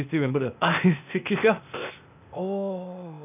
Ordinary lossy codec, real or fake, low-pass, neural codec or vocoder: none; fake; 3.6 kHz; codec, 16 kHz, 0.8 kbps, ZipCodec